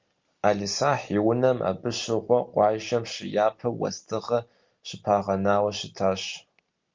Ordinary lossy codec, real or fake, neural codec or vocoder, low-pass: Opus, 32 kbps; real; none; 7.2 kHz